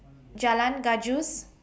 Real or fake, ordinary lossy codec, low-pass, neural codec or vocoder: real; none; none; none